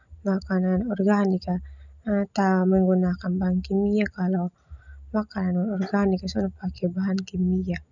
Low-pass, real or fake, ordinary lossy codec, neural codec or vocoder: 7.2 kHz; real; none; none